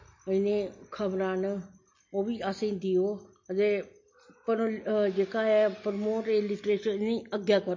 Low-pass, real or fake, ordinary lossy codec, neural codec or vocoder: 7.2 kHz; real; MP3, 32 kbps; none